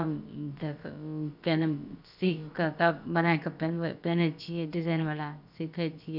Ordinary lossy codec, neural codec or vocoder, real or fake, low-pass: none; codec, 16 kHz, about 1 kbps, DyCAST, with the encoder's durations; fake; 5.4 kHz